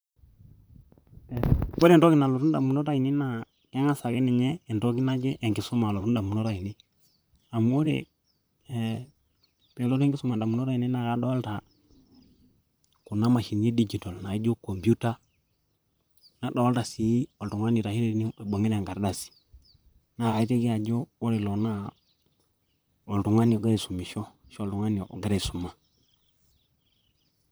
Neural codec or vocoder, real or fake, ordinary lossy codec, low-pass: vocoder, 44.1 kHz, 128 mel bands, Pupu-Vocoder; fake; none; none